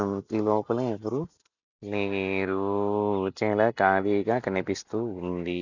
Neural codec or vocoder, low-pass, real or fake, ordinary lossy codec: none; 7.2 kHz; real; none